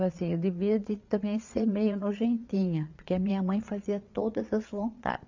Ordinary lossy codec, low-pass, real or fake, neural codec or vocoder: MP3, 64 kbps; 7.2 kHz; fake; vocoder, 22.05 kHz, 80 mel bands, Vocos